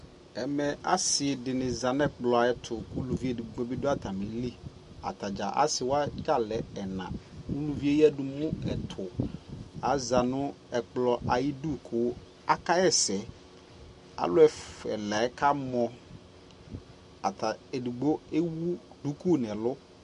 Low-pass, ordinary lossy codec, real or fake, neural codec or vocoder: 14.4 kHz; MP3, 48 kbps; real; none